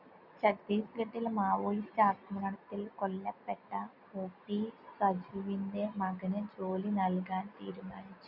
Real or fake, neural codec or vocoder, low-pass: real; none; 5.4 kHz